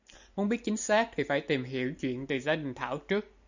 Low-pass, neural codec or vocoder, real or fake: 7.2 kHz; none; real